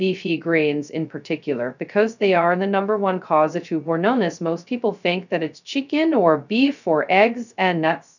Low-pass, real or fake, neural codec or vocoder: 7.2 kHz; fake; codec, 16 kHz, 0.2 kbps, FocalCodec